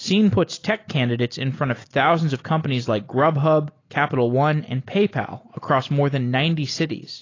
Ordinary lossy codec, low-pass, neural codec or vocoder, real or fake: AAC, 32 kbps; 7.2 kHz; none; real